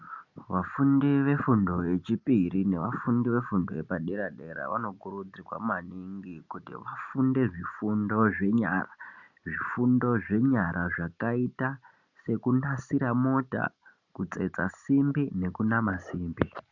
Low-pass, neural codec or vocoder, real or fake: 7.2 kHz; none; real